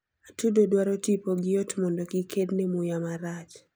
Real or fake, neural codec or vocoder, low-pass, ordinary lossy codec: real; none; none; none